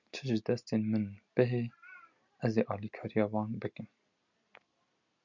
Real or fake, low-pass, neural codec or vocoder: real; 7.2 kHz; none